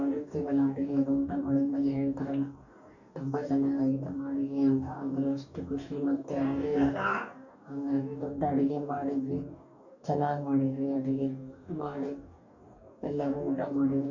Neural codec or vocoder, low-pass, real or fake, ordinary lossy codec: codec, 44.1 kHz, 2.6 kbps, DAC; 7.2 kHz; fake; none